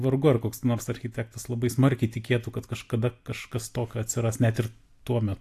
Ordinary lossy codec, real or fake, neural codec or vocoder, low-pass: AAC, 64 kbps; real; none; 14.4 kHz